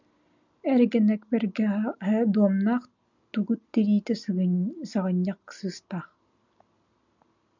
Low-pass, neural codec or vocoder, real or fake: 7.2 kHz; none; real